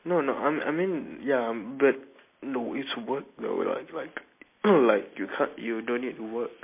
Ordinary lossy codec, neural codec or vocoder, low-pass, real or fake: MP3, 24 kbps; none; 3.6 kHz; real